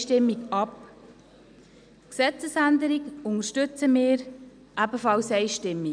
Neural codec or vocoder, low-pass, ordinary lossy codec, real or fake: none; 9.9 kHz; none; real